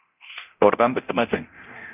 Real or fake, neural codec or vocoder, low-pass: fake; codec, 24 kHz, 0.9 kbps, DualCodec; 3.6 kHz